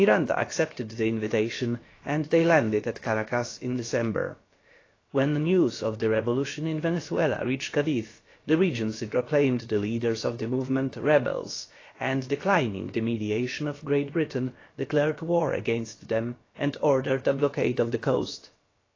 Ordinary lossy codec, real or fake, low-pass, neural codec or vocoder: AAC, 32 kbps; fake; 7.2 kHz; codec, 16 kHz, about 1 kbps, DyCAST, with the encoder's durations